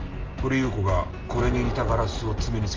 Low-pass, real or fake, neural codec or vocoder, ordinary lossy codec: 7.2 kHz; real; none; Opus, 24 kbps